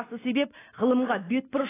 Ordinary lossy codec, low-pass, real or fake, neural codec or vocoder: AAC, 16 kbps; 3.6 kHz; real; none